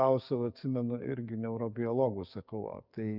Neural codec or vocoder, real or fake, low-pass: codec, 44.1 kHz, 7.8 kbps, DAC; fake; 5.4 kHz